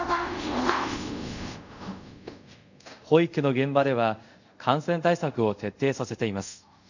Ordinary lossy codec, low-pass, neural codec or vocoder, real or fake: none; 7.2 kHz; codec, 24 kHz, 0.5 kbps, DualCodec; fake